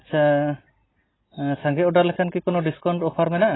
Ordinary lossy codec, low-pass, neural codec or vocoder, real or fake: AAC, 16 kbps; 7.2 kHz; none; real